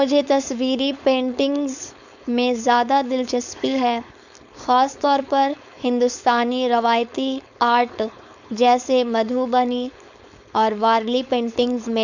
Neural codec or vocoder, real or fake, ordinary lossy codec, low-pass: codec, 16 kHz, 4.8 kbps, FACodec; fake; none; 7.2 kHz